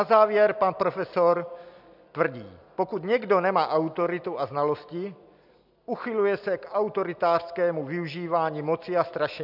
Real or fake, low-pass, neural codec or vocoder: real; 5.4 kHz; none